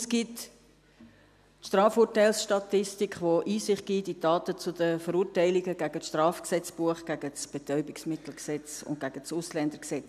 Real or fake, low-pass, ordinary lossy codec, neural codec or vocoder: real; 14.4 kHz; none; none